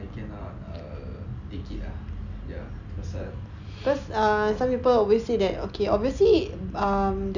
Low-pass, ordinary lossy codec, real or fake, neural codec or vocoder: 7.2 kHz; none; real; none